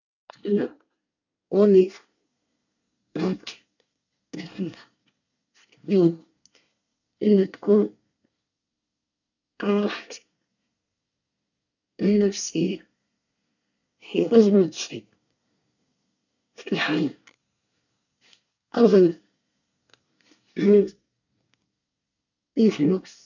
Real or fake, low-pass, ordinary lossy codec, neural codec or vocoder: fake; 7.2 kHz; none; codec, 24 kHz, 1 kbps, SNAC